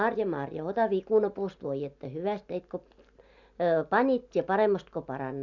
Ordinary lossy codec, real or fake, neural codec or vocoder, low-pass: none; real; none; 7.2 kHz